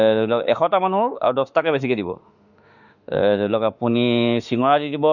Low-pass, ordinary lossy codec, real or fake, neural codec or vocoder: 7.2 kHz; none; fake; autoencoder, 48 kHz, 32 numbers a frame, DAC-VAE, trained on Japanese speech